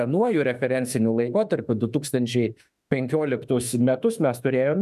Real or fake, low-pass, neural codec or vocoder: fake; 14.4 kHz; autoencoder, 48 kHz, 32 numbers a frame, DAC-VAE, trained on Japanese speech